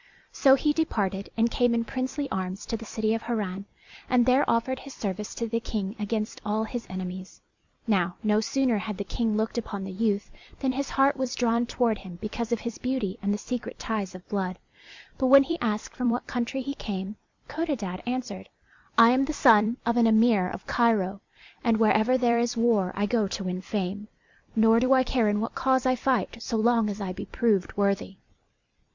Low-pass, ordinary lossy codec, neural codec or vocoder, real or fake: 7.2 kHz; Opus, 64 kbps; vocoder, 44.1 kHz, 128 mel bands every 256 samples, BigVGAN v2; fake